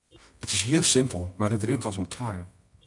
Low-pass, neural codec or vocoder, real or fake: 10.8 kHz; codec, 24 kHz, 0.9 kbps, WavTokenizer, medium music audio release; fake